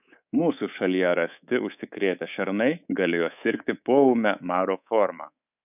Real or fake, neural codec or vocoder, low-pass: fake; codec, 24 kHz, 3.1 kbps, DualCodec; 3.6 kHz